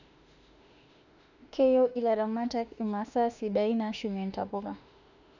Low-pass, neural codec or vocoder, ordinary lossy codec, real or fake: 7.2 kHz; autoencoder, 48 kHz, 32 numbers a frame, DAC-VAE, trained on Japanese speech; none; fake